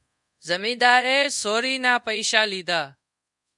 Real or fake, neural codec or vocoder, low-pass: fake; codec, 24 kHz, 0.5 kbps, DualCodec; 10.8 kHz